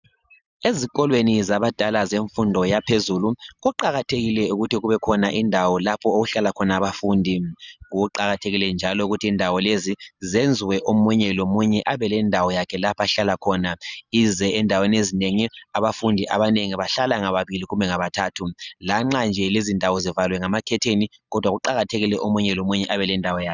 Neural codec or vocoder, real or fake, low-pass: none; real; 7.2 kHz